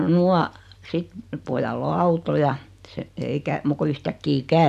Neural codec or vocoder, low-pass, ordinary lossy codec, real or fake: none; 14.4 kHz; none; real